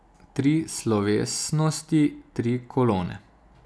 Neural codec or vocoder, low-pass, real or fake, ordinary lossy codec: none; none; real; none